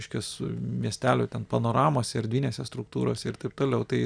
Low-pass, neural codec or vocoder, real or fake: 9.9 kHz; none; real